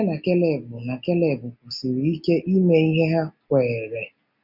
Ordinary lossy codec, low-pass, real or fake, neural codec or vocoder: none; 5.4 kHz; real; none